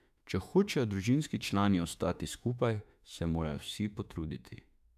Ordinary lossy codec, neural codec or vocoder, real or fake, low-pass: none; autoencoder, 48 kHz, 32 numbers a frame, DAC-VAE, trained on Japanese speech; fake; 14.4 kHz